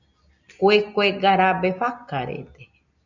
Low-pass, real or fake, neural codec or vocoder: 7.2 kHz; real; none